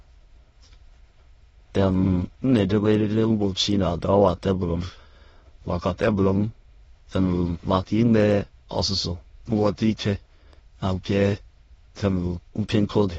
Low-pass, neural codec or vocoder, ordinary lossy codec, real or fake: 9.9 kHz; autoencoder, 22.05 kHz, a latent of 192 numbers a frame, VITS, trained on many speakers; AAC, 24 kbps; fake